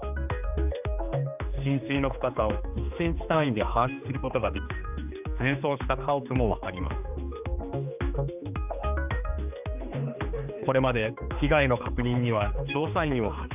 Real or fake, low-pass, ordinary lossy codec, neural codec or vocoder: fake; 3.6 kHz; none; codec, 16 kHz, 2 kbps, X-Codec, HuBERT features, trained on general audio